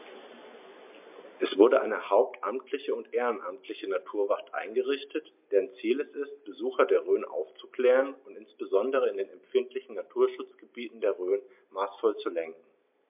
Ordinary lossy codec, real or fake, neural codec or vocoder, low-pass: MP3, 32 kbps; real; none; 3.6 kHz